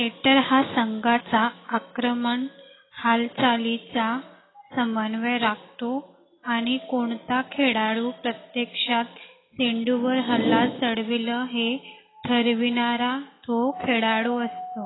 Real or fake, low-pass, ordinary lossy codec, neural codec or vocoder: real; 7.2 kHz; AAC, 16 kbps; none